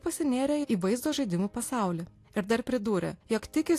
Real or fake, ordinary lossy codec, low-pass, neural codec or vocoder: real; AAC, 64 kbps; 14.4 kHz; none